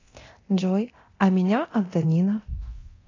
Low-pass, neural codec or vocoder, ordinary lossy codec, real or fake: 7.2 kHz; codec, 24 kHz, 0.9 kbps, DualCodec; AAC, 32 kbps; fake